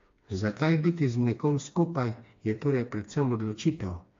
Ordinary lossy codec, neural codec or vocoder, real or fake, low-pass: AAC, 48 kbps; codec, 16 kHz, 2 kbps, FreqCodec, smaller model; fake; 7.2 kHz